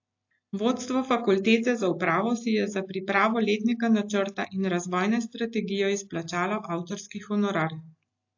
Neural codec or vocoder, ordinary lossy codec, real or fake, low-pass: none; AAC, 48 kbps; real; 7.2 kHz